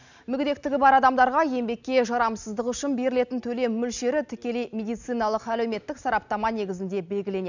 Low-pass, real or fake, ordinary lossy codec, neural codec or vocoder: 7.2 kHz; real; none; none